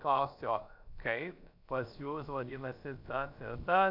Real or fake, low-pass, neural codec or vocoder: fake; 5.4 kHz; codec, 16 kHz, about 1 kbps, DyCAST, with the encoder's durations